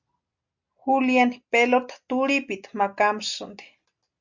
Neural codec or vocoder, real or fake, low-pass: none; real; 7.2 kHz